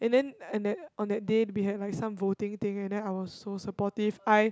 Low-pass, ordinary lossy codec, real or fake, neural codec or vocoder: none; none; real; none